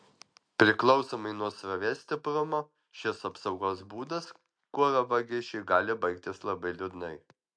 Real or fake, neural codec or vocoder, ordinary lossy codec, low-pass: real; none; MP3, 64 kbps; 9.9 kHz